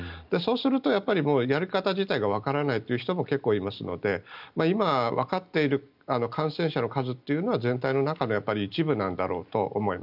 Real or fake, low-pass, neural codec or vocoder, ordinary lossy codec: real; 5.4 kHz; none; none